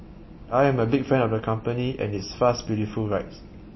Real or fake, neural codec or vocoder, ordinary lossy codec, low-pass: real; none; MP3, 24 kbps; 7.2 kHz